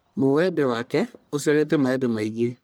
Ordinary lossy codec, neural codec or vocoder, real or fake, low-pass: none; codec, 44.1 kHz, 1.7 kbps, Pupu-Codec; fake; none